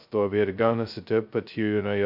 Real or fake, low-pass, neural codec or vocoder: fake; 5.4 kHz; codec, 16 kHz, 0.2 kbps, FocalCodec